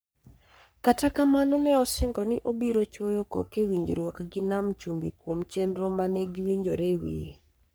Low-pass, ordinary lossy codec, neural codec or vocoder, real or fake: none; none; codec, 44.1 kHz, 3.4 kbps, Pupu-Codec; fake